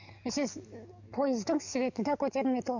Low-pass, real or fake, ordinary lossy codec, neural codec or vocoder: 7.2 kHz; fake; none; codec, 44.1 kHz, 7.8 kbps, DAC